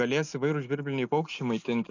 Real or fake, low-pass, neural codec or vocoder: real; 7.2 kHz; none